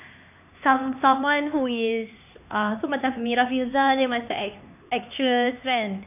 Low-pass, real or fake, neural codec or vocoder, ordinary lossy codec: 3.6 kHz; fake; codec, 16 kHz, 4 kbps, X-Codec, HuBERT features, trained on LibriSpeech; none